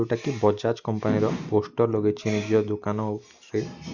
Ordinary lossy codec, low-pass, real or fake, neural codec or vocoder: Opus, 64 kbps; 7.2 kHz; real; none